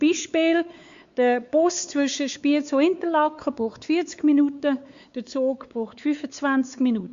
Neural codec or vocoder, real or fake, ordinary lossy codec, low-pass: codec, 16 kHz, 4 kbps, X-Codec, WavLM features, trained on Multilingual LibriSpeech; fake; Opus, 64 kbps; 7.2 kHz